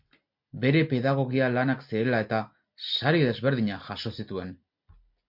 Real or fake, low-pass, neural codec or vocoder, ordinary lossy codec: real; 5.4 kHz; none; MP3, 48 kbps